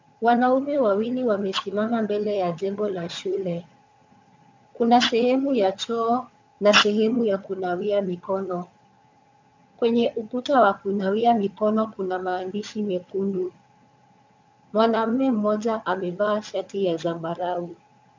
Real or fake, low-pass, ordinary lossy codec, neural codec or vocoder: fake; 7.2 kHz; MP3, 64 kbps; vocoder, 22.05 kHz, 80 mel bands, HiFi-GAN